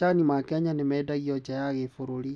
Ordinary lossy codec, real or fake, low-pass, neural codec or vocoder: none; real; none; none